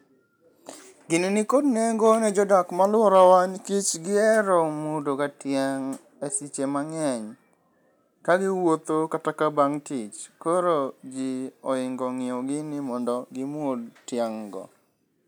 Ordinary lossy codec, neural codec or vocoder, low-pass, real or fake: none; none; none; real